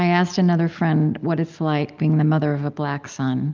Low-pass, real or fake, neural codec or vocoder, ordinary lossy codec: 7.2 kHz; real; none; Opus, 24 kbps